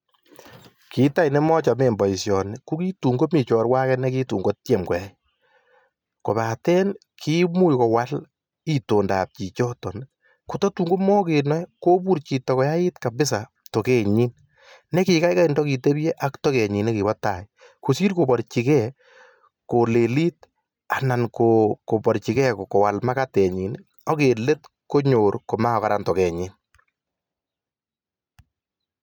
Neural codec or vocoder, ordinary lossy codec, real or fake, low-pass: none; none; real; none